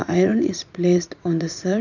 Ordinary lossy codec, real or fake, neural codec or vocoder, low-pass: none; fake; vocoder, 44.1 kHz, 80 mel bands, Vocos; 7.2 kHz